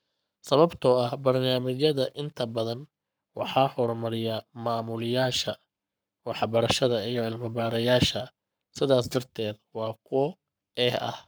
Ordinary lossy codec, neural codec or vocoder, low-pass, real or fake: none; codec, 44.1 kHz, 7.8 kbps, Pupu-Codec; none; fake